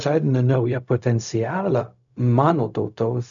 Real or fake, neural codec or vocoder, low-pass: fake; codec, 16 kHz, 0.4 kbps, LongCat-Audio-Codec; 7.2 kHz